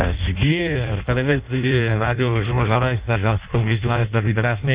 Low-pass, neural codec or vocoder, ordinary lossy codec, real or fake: 3.6 kHz; codec, 16 kHz in and 24 kHz out, 0.6 kbps, FireRedTTS-2 codec; Opus, 64 kbps; fake